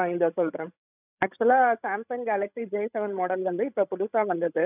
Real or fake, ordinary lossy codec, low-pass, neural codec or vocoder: fake; none; 3.6 kHz; codec, 16 kHz, 16 kbps, FreqCodec, larger model